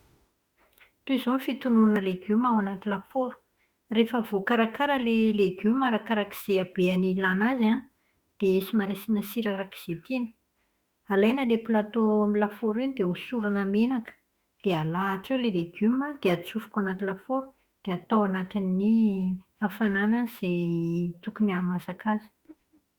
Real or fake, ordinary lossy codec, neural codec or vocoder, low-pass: fake; Opus, 64 kbps; autoencoder, 48 kHz, 32 numbers a frame, DAC-VAE, trained on Japanese speech; 19.8 kHz